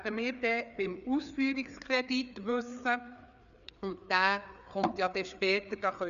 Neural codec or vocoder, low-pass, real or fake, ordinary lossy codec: codec, 16 kHz, 4 kbps, FreqCodec, larger model; 7.2 kHz; fake; none